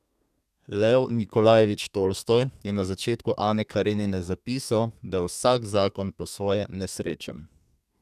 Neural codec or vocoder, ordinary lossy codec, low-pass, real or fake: codec, 32 kHz, 1.9 kbps, SNAC; none; 14.4 kHz; fake